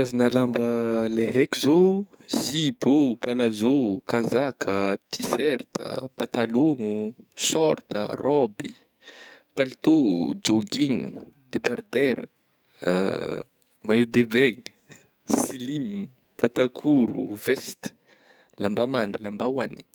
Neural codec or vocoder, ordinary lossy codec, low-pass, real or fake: codec, 44.1 kHz, 2.6 kbps, SNAC; none; none; fake